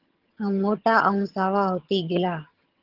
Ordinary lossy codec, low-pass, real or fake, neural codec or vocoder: Opus, 16 kbps; 5.4 kHz; fake; vocoder, 22.05 kHz, 80 mel bands, HiFi-GAN